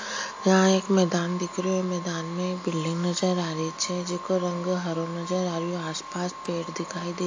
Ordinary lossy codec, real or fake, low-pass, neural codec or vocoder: AAC, 48 kbps; real; 7.2 kHz; none